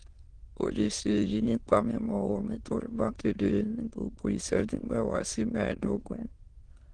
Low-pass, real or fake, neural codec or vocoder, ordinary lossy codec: 9.9 kHz; fake; autoencoder, 22.05 kHz, a latent of 192 numbers a frame, VITS, trained on many speakers; Opus, 16 kbps